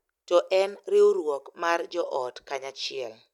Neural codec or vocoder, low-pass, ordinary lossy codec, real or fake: none; 19.8 kHz; none; real